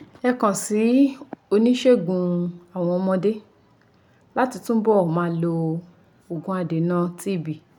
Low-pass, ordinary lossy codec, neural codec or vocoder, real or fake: 19.8 kHz; none; none; real